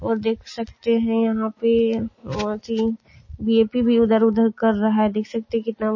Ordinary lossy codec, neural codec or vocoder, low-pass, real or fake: MP3, 32 kbps; none; 7.2 kHz; real